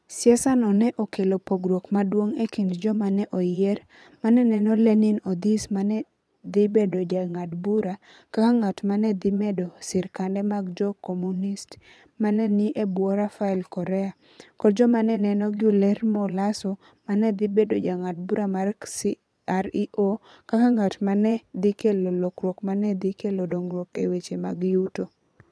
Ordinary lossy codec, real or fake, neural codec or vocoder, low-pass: none; fake; vocoder, 22.05 kHz, 80 mel bands, Vocos; none